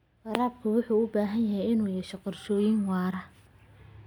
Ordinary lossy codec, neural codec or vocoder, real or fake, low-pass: none; none; real; 19.8 kHz